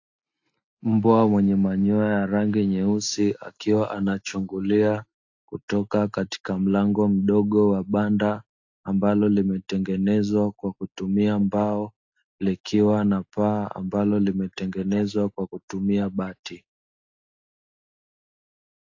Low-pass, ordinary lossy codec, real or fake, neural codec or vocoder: 7.2 kHz; AAC, 48 kbps; real; none